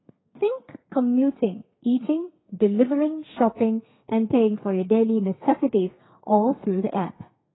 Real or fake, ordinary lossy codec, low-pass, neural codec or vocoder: fake; AAC, 16 kbps; 7.2 kHz; codec, 32 kHz, 1.9 kbps, SNAC